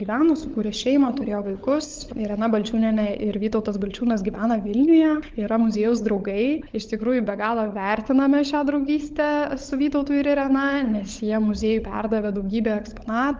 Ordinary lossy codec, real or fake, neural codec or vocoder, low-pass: Opus, 32 kbps; fake; codec, 16 kHz, 16 kbps, FunCodec, trained on LibriTTS, 50 frames a second; 7.2 kHz